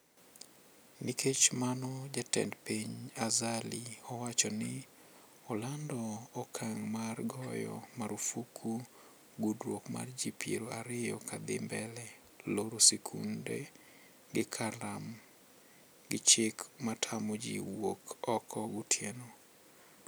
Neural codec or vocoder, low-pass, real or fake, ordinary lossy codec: none; none; real; none